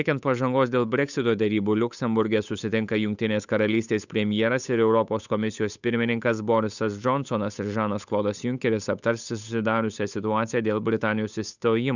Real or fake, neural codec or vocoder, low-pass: fake; codec, 16 kHz, 8 kbps, FunCodec, trained on Chinese and English, 25 frames a second; 7.2 kHz